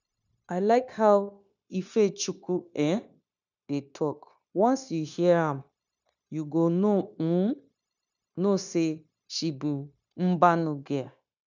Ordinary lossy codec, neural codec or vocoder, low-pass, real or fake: none; codec, 16 kHz, 0.9 kbps, LongCat-Audio-Codec; 7.2 kHz; fake